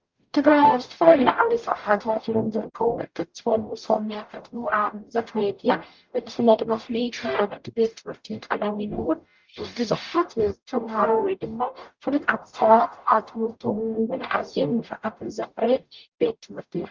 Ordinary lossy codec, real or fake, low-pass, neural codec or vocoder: Opus, 32 kbps; fake; 7.2 kHz; codec, 44.1 kHz, 0.9 kbps, DAC